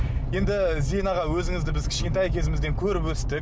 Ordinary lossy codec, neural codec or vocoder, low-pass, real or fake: none; none; none; real